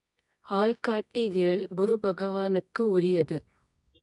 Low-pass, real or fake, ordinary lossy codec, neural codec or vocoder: 10.8 kHz; fake; none; codec, 24 kHz, 0.9 kbps, WavTokenizer, medium music audio release